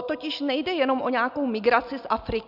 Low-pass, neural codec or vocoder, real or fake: 5.4 kHz; none; real